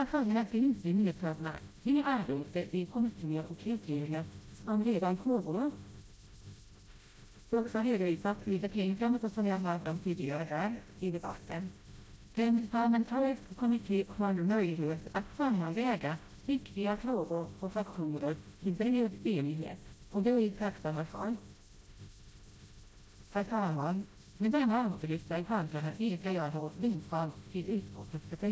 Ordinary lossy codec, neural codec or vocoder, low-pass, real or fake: none; codec, 16 kHz, 0.5 kbps, FreqCodec, smaller model; none; fake